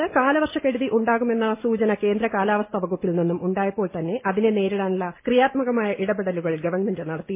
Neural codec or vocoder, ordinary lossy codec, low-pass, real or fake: none; MP3, 24 kbps; 3.6 kHz; real